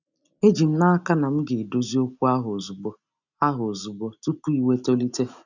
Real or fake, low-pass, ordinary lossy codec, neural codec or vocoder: real; 7.2 kHz; none; none